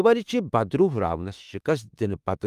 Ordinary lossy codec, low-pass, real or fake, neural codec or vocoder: Opus, 32 kbps; 14.4 kHz; fake; autoencoder, 48 kHz, 32 numbers a frame, DAC-VAE, trained on Japanese speech